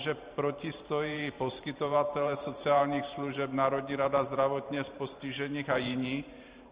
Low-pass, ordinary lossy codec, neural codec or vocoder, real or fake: 3.6 kHz; Opus, 64 kbps; vocoder, 44.1 kHz, 128 mel bands every 512 samples, BigVGAN v2; fake